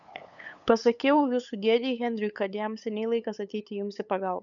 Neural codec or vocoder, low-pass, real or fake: codec, 16 kHz, 8 kbps, FunCodec, trained on LibriTTS, 25 frames a second; 7.2 kHz; fake